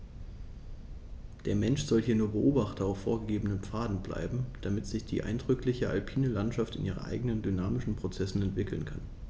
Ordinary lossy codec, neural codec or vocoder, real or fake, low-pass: none; none; real; none